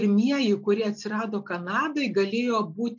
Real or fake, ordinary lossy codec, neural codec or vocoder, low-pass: real; MP3, 48 kbps; none; 7.2 kHz